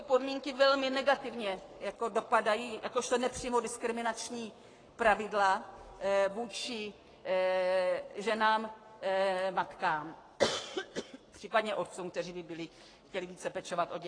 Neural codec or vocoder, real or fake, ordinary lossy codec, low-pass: codec, 44.1 kHz, 7.8 kbps, Pupu-Codec; fake; AAC, 32 kbps; 9.9 kHz